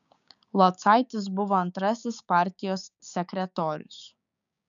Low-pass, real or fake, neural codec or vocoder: 7.2 kHz; fake; codec, 16 kHz, 6 kbps, DAC